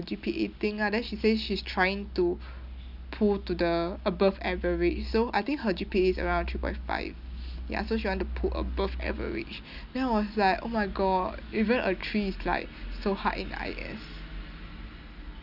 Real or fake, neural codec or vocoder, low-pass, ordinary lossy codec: real; none; 5.4 kHz; none